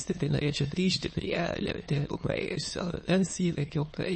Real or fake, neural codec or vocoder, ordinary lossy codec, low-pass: fake; autoencoder, 22.05 kHz, a latent of 192 numbers a frame, VITS, trained on many speakers; MP3, 32 kbps; 9.9 kHz